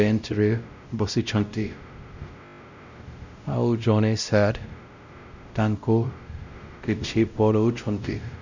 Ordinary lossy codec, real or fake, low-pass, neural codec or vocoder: none; fake; 7.2 kHz; codec, 16 kHz, 0.5 kbps, X-Codec, WavLM features, trained on Multilingual LibriSpeech